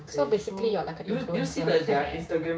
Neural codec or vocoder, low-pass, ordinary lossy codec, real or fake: codec, 16 kHz, 6 kbps, DAC; none; none; fake